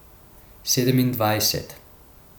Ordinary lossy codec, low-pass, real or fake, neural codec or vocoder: none; none; real; none